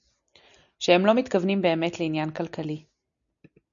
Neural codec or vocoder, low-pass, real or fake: none; 7.2 kHz; real